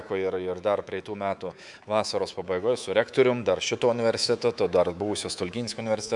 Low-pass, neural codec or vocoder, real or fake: 10.8 kHz; codec, 24 kHz, 3.1 kbps, DualCodec; fake